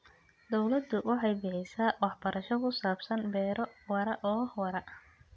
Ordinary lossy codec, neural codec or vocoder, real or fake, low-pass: none; none; real; none